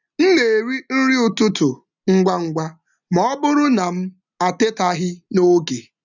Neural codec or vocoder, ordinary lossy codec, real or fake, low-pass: none; none; real; 7.2 kHz